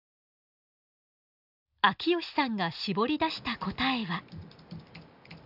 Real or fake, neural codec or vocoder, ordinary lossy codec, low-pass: real; none; none; 5.4 kHz